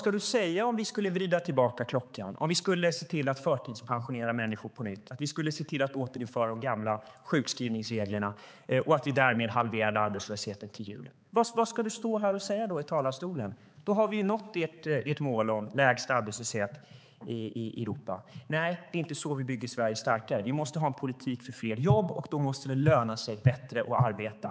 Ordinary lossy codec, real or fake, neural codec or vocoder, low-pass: none; fake; codec, 16 kHz, 4 kbps, X-Codec, HuBERT features, trained on balanced general audio; none